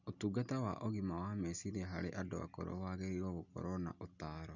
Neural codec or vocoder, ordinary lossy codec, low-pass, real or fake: none; none; 7.2 kHz; real